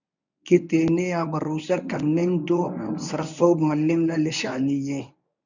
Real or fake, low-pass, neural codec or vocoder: fake; 7.2 kHz; codec, 24 kHz, 0.9 kbps, WavTokenizer, medium speech release version 1